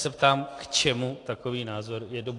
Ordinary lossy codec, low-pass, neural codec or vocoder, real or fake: AAC, 48 kbps; 10.8 kHz; none; real